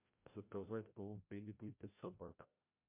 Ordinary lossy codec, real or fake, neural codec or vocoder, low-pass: MP3, 32 kbps; fake; codec, 16 kHz, 0.5 kbps, FreqCodec, larger model; 3.6 kHz